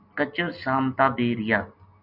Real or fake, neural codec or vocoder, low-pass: real; none; 5.4 kHz